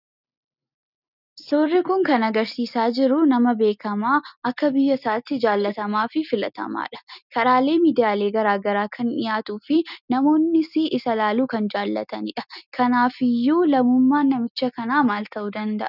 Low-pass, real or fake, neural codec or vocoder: 5.4 kHz; real; none